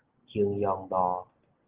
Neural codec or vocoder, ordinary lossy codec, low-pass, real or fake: none; Opus, 16 kbps; 3.6 kHz; real